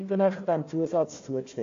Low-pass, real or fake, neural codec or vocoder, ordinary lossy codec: 7.2 kHz; fake; codec, 16 kHz, 1 kbps, FunCodec, trained on Chinese and English, 50 frames a second; AAC, 48 kbps